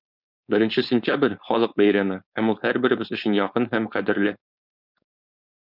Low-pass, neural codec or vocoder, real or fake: 5.4 kHz; codec, 16 kHz, 4.8 kbps, FACodec; fake